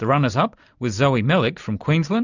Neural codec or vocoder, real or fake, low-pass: none; real; 7.2 kHz